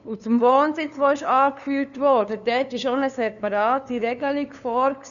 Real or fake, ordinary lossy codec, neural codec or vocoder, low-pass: fake; none; codec, 16 kHz, 2 kbps, FunCodec, trained on LibriTTS, 25 frames a second; 7.2 kHz